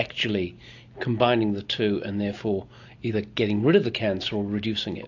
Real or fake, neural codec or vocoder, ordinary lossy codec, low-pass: real; none; AAC, 48 kbps; 7.2 kHz